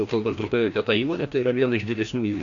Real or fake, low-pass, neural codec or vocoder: fake; 7.2 kHz; codec, 16 kHz, 1 kbps, FreqCodec, larger model